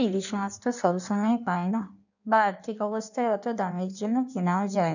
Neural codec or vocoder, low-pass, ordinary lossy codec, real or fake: codec, 16 kHz in and 24 kHz out, 1.1 kbps, FireRedTTS-2 codec; 7.2 kHz; none; fake